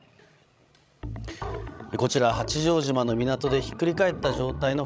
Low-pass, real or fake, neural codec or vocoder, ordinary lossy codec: none; fake; codec, 16 kHz, 16 kbps, FreqCodec, larger model; none